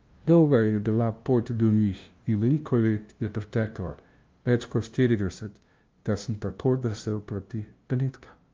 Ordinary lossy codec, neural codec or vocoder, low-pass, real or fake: Opus, 24 kbps; codec, 16 kHz, 0.5 kbps, FunCodec, trained on LibriTTS, 25 frames a second; 7.2 kHz; fake